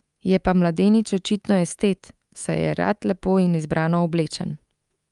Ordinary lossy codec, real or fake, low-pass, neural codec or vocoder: Opus, 32 kbps; fake; 10.8 kHz; codec, 24 kHz, 3.1 kbps, DualCodec